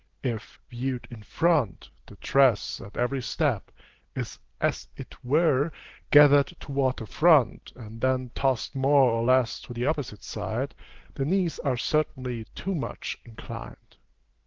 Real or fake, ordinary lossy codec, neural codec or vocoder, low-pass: real; Opus, 16 kbps; none; 7.2 kHz